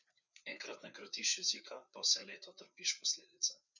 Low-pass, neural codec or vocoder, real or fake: 7.2 kHz; codec, 16 kHz, 4 kbps, FreqCodec, larger model; fake